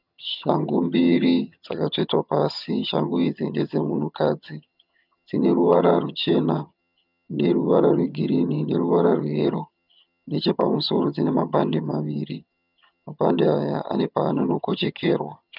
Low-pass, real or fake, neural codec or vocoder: 5.4 kHz; fake; vocoder, 22.05 kHz, 80 mel bands, HiFi-GAN